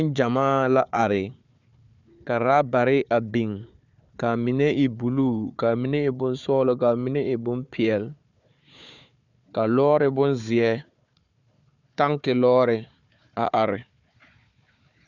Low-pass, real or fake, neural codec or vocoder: 7.2 kHz; fake; codec, 16 kHz, 4 kbps, FunCodec, trained on Chinese and English, 50 frames a second